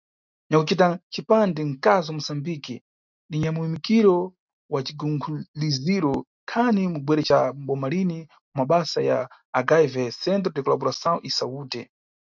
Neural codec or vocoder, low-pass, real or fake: none; 7.2 kHz; real